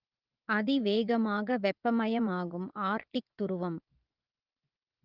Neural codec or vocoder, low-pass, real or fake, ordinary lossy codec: vocoder, 24 kHz, 100 mel bands, Vocos; 5.4 kHz; fake; Opus, 32 kbps